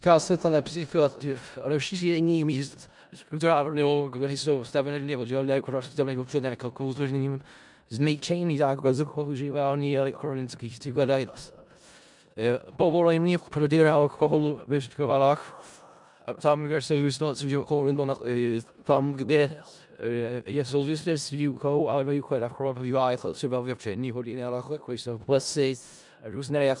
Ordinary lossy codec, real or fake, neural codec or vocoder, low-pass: MP3, 96 kbps; fake; codec, 16 kHz in and 24 kHz out, 0.4 kbps, LongCat-Audio-Codec, four codebook decoder; 10.8 kHz